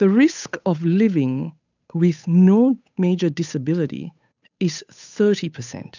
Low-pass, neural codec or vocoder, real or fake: 7.2 kHz; codec, 16 kHz, 8 kbps, FunCodec, trained on Chinese and English, 25 frames a second; fake